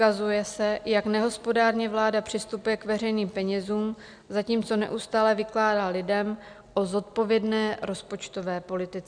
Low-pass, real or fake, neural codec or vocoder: 9.9 kHz; real; none